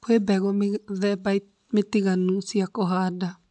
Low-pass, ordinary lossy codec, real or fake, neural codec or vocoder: 10.8 kHz; none; real; none